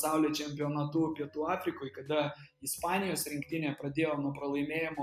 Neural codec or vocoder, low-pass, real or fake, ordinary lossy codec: none; 14.4 kHz; real; MP3, 96 kbps